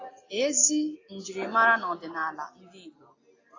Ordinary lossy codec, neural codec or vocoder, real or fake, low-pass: AAC, 32 kbps; none; real; 7.2 kHz